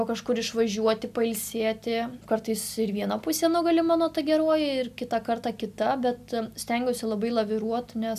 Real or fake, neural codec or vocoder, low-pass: real; none; 14.4 kHz